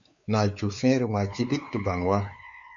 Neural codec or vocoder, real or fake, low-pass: codec, 16 kHz, 4 kbps, X-Codec, WavLM features, trained on Multilingual LibriSpeech; fake; 7.2 kHz